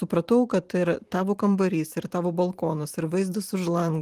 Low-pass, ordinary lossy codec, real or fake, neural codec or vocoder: 14.4 kHz; Opus, 24 kbps; fake; vocoder, 44.1 kHz, 128 mel bands every 512 samples, BigVGAN v2